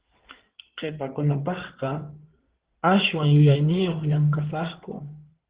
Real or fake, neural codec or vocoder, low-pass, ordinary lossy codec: fake; codec, 16 kHz in and 24 kHz out, 2.2 kbps, FireRedTTS-2 codec; 3.6 kHz; Opus, 16 kbps